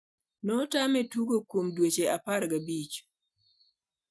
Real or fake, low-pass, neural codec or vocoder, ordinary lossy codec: real; none; none; none